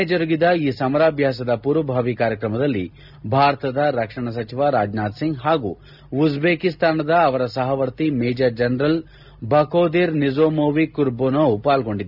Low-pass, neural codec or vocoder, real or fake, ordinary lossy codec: 5.4 kHz; none; real; none